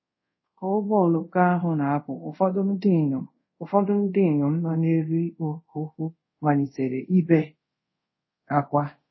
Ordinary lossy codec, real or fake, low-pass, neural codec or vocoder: MP3, 24 kbps; fake; 7.2 kHz; codec, 24 kHz, 0.5 kbps, DualCodec